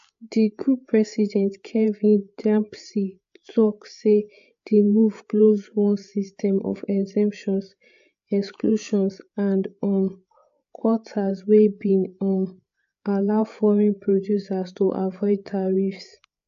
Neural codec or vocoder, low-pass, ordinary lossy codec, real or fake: codec, 16 kHz, 8 kbps, FreqCodec, larger model; 7.2 kHz; AAC, 64 kbps; fake